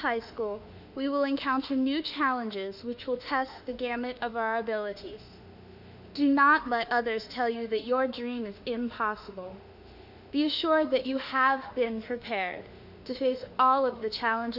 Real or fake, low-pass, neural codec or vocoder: fake; 5.4 kHz; autoencoder, 48 kHz, 32 numbers a frame, DAC-VAE, trained on Japanese speech